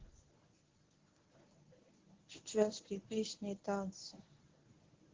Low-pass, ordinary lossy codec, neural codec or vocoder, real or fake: 7.2 kHz; Opus, 16 kbps; codec, 24 kHz, 0.9 kbps, WavTokenizer, medium speech release version 1; fake